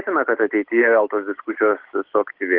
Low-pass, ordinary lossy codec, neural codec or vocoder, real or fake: 5.4 kHz; Opus, 16 kbps; none; real